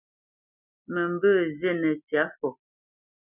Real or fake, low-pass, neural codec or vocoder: real; 3.6 kHz; none